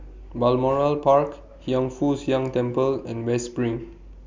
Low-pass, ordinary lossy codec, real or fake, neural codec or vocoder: 7.2 kHz; MP3, 64 kbps; real; none